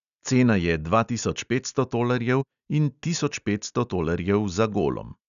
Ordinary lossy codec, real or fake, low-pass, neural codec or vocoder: none; real; 7.2 kHz; none